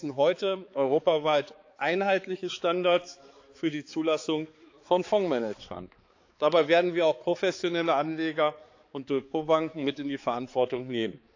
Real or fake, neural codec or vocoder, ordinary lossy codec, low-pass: fake; codec, 16 kHz, 4 kbps, X-Codec, HuBERT features, trained on balanced general audio; AAC, 48 kbps; 7.2 kHz